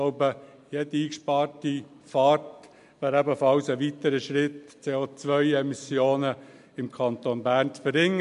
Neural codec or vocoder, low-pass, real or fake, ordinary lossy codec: none; 10.8 kHz; real; MP3, 64 kbps